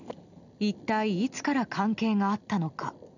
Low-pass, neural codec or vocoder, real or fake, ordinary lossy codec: 7.2 kHz; none; real; none